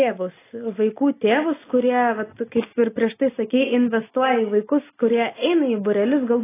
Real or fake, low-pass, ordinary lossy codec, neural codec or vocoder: real; 3.6 kHz; AAC, 16 kbps; none